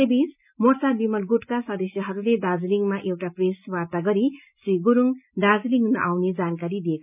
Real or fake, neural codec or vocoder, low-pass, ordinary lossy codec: real; none; 3.6 kHz; none